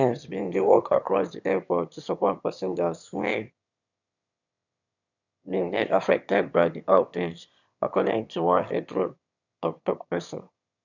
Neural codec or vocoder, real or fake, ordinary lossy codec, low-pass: autoencoder, 22.05 kHz, a latent of 192 numbers a frame, VITS, trained on one speaker; fake; none; 7.2 kHz